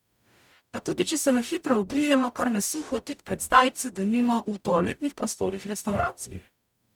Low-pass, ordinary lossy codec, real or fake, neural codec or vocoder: 19.8 kHz; none; fake; codec, 44.1 kHz, 0.9 kbps, DAC